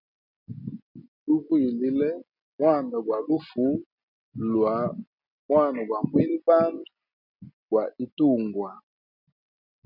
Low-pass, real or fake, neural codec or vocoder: 5.4 kHz; real; none